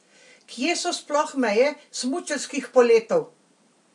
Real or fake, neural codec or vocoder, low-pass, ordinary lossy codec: real; none; 10.8 kHz; AAC, 64 kbps